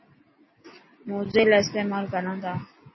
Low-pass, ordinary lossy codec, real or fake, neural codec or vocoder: 7.2 kHz; MP3, 24 kbps; real; none